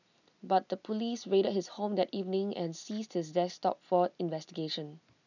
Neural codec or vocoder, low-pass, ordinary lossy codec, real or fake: none; 7.2 kHz; none; real